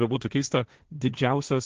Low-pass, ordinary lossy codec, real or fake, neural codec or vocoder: 7.2 kHz; Opus, 16 kbps; fake; codec, 16 kHz, 1.1 kbps, Voila-Tokenizer